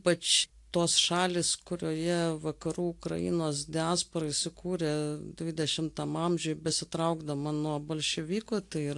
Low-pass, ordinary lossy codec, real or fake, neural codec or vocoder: 10.8 kHz; AAC, 64 kbps; real; none